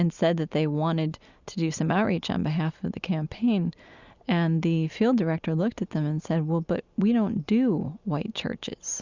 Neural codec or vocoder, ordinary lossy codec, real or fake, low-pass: none; Opus, 64 kbps; real; 7.2 kHz